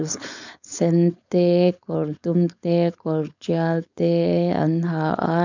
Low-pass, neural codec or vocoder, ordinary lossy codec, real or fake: 7.2 kHz; codec, 16 kHz, 4.8 kbps, FACodec; none; fake